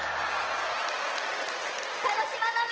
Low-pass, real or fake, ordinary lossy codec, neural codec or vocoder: 7.2 kHz; real; Opus, 16 kbps; none